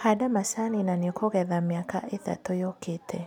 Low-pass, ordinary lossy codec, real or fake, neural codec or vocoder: 19.8 kHz; none; real; none